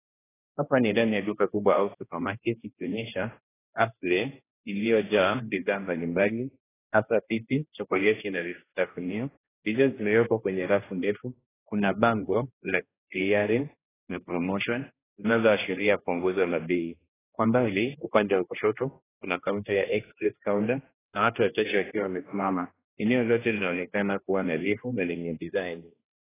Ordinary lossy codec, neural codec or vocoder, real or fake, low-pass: AAC, 16 kbps; codec, 16 kHz, 1 kbps, X-Codec, HuBERT features, trained on general audio; fake; 3.6 kHz